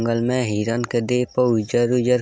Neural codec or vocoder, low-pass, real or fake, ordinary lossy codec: none; none; real; none